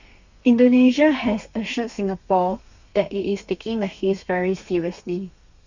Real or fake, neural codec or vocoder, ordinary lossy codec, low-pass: fake; codec, 32 kHz, 1.9 kbps, SNAC; Opus, 64 kbps; 7.2 kHz